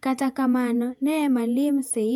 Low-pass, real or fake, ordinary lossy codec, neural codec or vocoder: 19.8 kHz; fake; none; vocoder, 48 kHz, 128 mel bands, Vocos